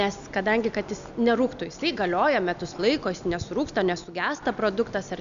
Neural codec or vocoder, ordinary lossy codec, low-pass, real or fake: none; MP3, 96 kbps; 7.2 kHz; real